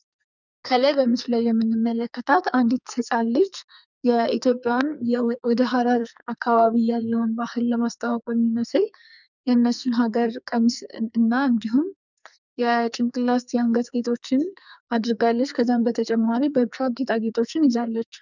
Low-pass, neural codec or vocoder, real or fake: 7.2 kHz; codec, 44.1 kHz, 2.6 kbps, SNAC; fake